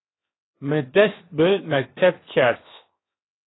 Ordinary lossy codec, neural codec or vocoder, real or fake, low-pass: AAC, 16 kbps; codec, 16 kHz, 0.7 kbps, FocalCodec; fake; 7.2 kHz